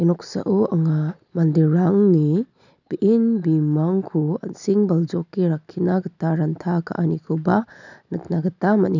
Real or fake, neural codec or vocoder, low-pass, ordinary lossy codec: real; none; 7.2 kHz; none